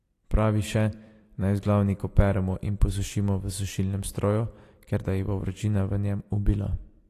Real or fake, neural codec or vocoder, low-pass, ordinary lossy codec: fake; vocoder, 44.1 kHz, 128 mel bands every 256 samples, BigVGAN v2; 14.4 kHz; AAC, 48 kbps